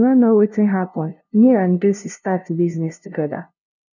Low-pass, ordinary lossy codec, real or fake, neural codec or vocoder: 7.2 kHz; none; fake; codec, 16 kHz, 0.5 kbps, FunCodec, trained on LibriTTS, 25 frames a second